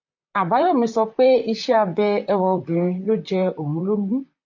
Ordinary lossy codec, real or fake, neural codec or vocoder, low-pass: MP3, 48 kbps; fake; vocoder, 44.1 kHz, 128 mel bands, Pupu-Vocoder; 7.2 kHz